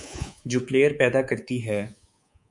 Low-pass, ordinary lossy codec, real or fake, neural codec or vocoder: 10.8 kHz; MP3, 64 kbps; fake; codec, 24 kHz, 3.1 kbps, DualCodec